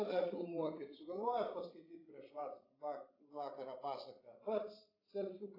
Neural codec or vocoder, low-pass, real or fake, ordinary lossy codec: codec, 16 kHz, 16 kbps, FreqCodec, larger model; 5.4 kHz; fake; AAC, 24 kbps